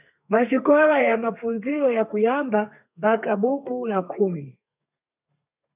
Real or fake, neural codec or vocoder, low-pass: fake; codec, 32 kHz, 1.9 kbps, SNAC; 3.6 kHz